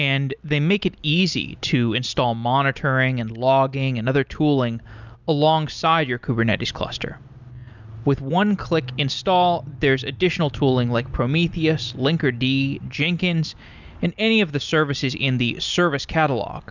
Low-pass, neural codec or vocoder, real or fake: 7.2 kHz; none; real